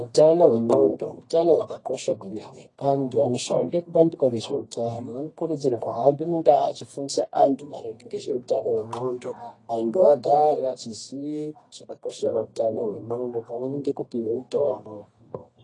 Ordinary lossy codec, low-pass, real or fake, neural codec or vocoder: AAC, 32 kbps; 10.8 kHz; fake; codec, 24 kHz, 0.9 kbps, WavTokenizer, medium music audio release